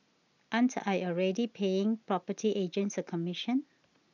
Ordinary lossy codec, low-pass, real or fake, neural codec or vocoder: none; 7.2 kHz; real; none